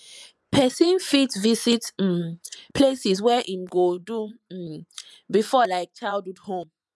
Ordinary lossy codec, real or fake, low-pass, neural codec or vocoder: none; fake; none; vocoder, 24 kHz, 100 mel bands, Vocos